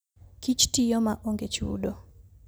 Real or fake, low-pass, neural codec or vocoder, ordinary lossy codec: real; none; none; none